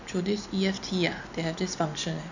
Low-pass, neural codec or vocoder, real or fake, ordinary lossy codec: 7.2 kHz; none; real; none